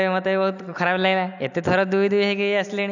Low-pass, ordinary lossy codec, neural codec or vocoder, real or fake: 7.2 kHz; none; none; real